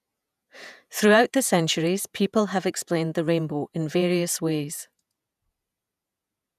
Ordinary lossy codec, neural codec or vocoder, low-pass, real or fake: none; vocoder, 48 kHz, 128 mel bands, Vocos; 14.4 kHz; fake